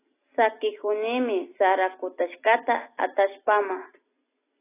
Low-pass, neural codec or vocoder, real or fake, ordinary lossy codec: 3.6 kHz; none; real; AAC, 16 kbps